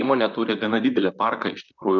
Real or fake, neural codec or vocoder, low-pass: fake; vocoder, 44.1 kHz, 128 mel bands, Pupu-Vocoder; 7.2 kHz